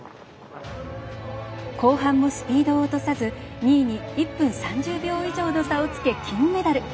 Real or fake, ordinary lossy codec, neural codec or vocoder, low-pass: real; none; none; none